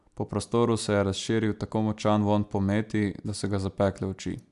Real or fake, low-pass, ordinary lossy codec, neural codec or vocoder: real; 10.8 kHz; AAC, 96 kbps; none